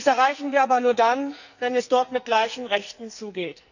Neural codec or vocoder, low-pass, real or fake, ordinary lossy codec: codec, 44.1 kHz, 2.6 kbps, SNAC; 7.2 kHz; fake; none